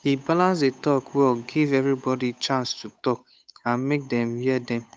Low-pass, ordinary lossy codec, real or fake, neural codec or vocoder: none; none; fake; codec, 16 kHz, 8 kbps, FunCodec, trained on Chinese and English, 25 frames a second